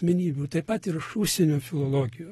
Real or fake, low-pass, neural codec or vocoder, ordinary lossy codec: real; 14.4 kHz; none; AAC, 32 kbps